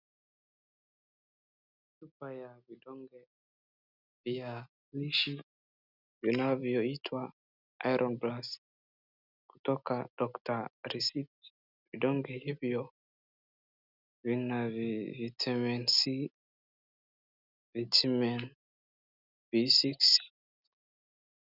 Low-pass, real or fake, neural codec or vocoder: 5.4 kHz; real; none